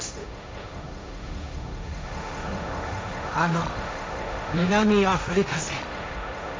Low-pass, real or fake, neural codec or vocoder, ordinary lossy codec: none; fake; codec, 16 kHz, 1.1 kbps, Voila-Tokenizer; none